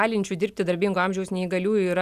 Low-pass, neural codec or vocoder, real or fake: 14.4 kHz; none; real